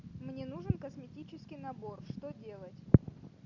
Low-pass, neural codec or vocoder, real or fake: 7.2 kHz; none; real